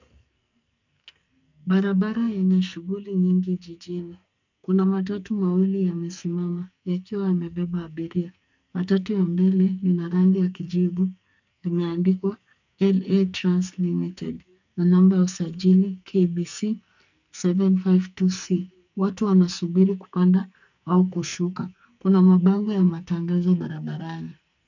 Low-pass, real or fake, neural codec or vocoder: 7.2 kHz; fake; codec, 44.1 kHz, 2.6 kbps, SNAC